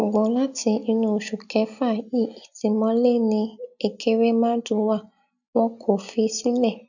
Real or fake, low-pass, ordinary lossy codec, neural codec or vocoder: real; 7.2 kHz; none; none